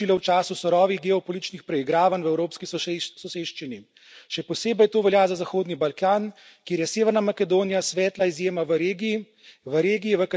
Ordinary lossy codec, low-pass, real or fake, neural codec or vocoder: none; none; real; none